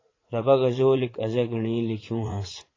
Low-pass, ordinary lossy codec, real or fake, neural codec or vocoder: 7.2 kHz; AAC, 32 kbps; fake; vocoder, 44.1 kHz, 80 mel bands, Vocos